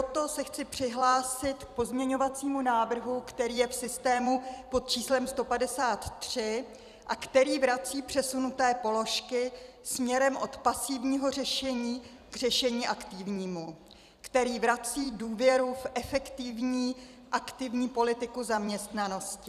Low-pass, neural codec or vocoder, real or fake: 14.4 kHz; vocoder, 44.1 kHz, 128 mel bands every 256 samples, BigVGAN v2; fake